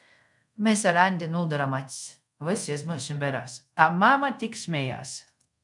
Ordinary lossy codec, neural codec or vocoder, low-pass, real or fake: MP3, 96 kbps; codec, 24 kHz, 0.5 kbps, DualCodec; 10.8 kHz; fake